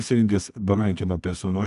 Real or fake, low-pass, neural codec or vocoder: fake; 10.8 kHz; codec, 24 kHz, 0.9 kbps, WavTokenizer, medium music audio release